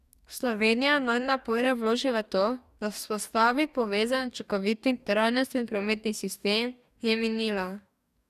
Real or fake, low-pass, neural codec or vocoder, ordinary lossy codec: fake; 14.4 kHz; codec, 44.1 kHz, 2.6 kbps, DAC; none